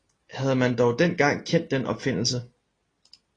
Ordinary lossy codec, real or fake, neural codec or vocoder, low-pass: AAC, 32 kbps; real; none; 9.9 kHz